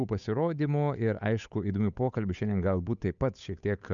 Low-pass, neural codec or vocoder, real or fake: 7.2 kHz; codec, 16 kHz, 8 kbps, FunCodec, trained on Chinese and English, 25 frames a second; fake